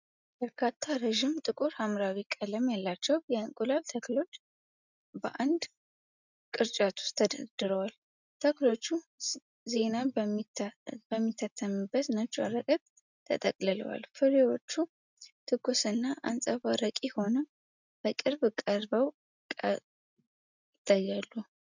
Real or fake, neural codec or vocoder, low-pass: real; none; 7.2 kHz